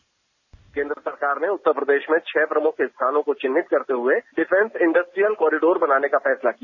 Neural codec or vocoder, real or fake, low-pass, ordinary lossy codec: none; real; 7.2 kHz; none